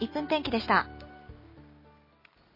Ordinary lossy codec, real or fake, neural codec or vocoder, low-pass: MP3, 24 kbps; real; none; 5.4 kHz